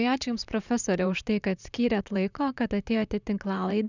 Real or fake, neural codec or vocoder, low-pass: fake; vocoder, 44.1 kHz, 128 mel bands, Pupu-Vocoder; 7.2 kHz